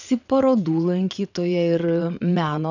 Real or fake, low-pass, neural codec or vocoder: fake; 7.2 kHz; vocoder, 22.05 kHz, 80 mel bands, WaveNeXt